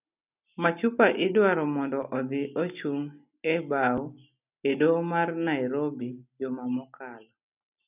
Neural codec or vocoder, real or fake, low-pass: none; real; 3.6 kHz